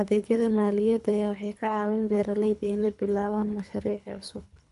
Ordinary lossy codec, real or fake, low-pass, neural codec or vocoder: none; fake; 10.8 kHz; codec, 24 kHz, 3 kbps, HILCodec